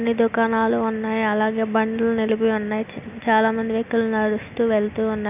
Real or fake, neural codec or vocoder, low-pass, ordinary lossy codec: real; none; 3.6 kHz; none